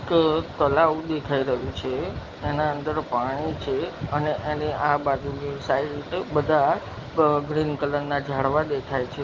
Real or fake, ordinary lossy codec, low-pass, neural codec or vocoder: real; Opus, 24 kbps; 7.2 kHz; none